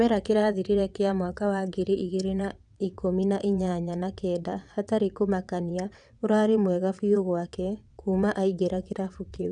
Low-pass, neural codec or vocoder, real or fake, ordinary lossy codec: 9.9 kHz; vocoder, 22.05 kHz, 80 mel bands, WaveNeXt; fake; none